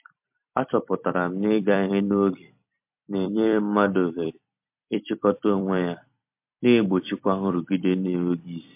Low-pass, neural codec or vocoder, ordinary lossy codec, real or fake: 3.6 kHz; vocoder, 44.1 kHz, 128 mel bands every 256 samples, BigVGAN v2; MP3, 32 kbps; fake